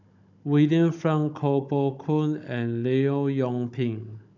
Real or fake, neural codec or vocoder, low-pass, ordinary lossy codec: fake; codec, 16 kHz, 16 kbps, FunCodec, trained on Chinese and English, 50 frames a second; 7.2 kHz; none